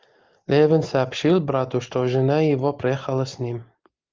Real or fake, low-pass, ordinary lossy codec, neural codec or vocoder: real; 7.2 kHz; Opus, 32 kbps; none